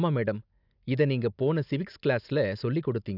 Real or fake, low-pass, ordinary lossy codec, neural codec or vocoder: real; 5.4 kHz; none; none